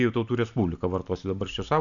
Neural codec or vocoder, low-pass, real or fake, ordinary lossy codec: none; 7.2 kHz; real; MP3, 96 kbps